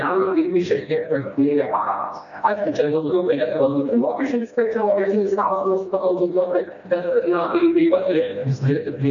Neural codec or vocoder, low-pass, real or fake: codec, 16 kHz, 1 kbps, FreqCodec, smaller model; 7.2 kHz; fake